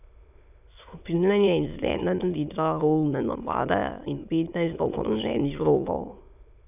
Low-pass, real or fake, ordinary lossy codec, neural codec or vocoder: 3.6 kHz; fake; none; autoencoder, 22.05 kHz, a latent of 192 numbers a frame, VITS, trained on many speakers